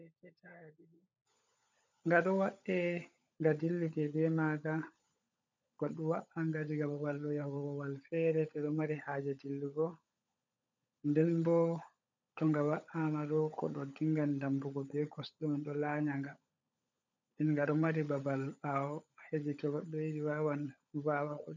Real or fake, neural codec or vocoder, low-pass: fake; codec, 16 kHz, 16 kbps, FunCodec, trained on LibriTTS, 50 frames a second; 7.2 kHz